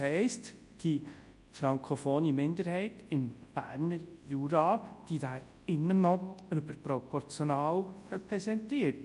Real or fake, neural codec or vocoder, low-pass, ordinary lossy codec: fake; codec, 24 kHz, 0.9 kbps, WavTokenizer, large speech release; 10.8 kHz; MP3, 48 kbps